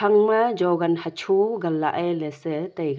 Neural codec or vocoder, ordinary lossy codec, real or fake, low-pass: none; none; real; none